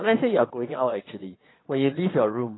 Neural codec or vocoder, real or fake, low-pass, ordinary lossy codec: vocoder, 22.05 kHz, 80 mel bands, Vocos; fake; 7.2 kHz; AAC, 16 kbps